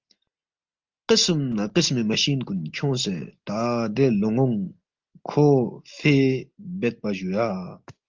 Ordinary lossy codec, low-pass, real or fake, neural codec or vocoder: Opus, 24 kbps; 7.2 kHz; real; none